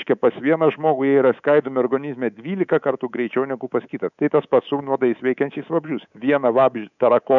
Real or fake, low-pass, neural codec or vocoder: fake; 7.2 kHz; codec, 24 kHz, 3.1 kbps, DualCodec